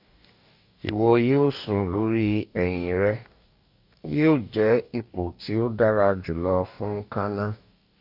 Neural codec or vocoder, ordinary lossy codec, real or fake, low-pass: codec, 44.1 kHz, 2.6 kbps, DAC; none; fake; 5.4 kHz